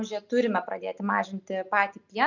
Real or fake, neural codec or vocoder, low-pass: real; none; 7.2 kHz